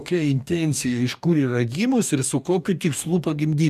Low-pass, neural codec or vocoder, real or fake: 14.4 kHz; codec, 44.1 kHz, 2.6 kbps, DAC; fake